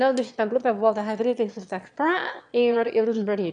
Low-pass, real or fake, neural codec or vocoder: 9.9 kHz; fake; autoencoder, 22.05 kHz, a latent of 192 numbers a frame, VITS, trained on one speaker